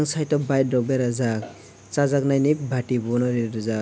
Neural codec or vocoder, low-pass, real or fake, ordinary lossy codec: none; none; real; none